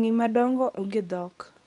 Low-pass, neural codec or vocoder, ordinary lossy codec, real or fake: 10.8 kHz; codec, 24 kHz, 0.9 kbps, WavTokenizer, medium speech release version 2; none; fake